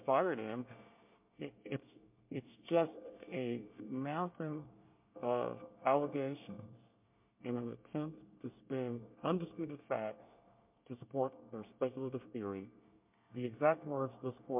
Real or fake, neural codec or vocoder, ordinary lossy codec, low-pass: fake; codec, 24 kHz, 1 kbps, SNAC; MP3, 32 kbps; 3.6 kHz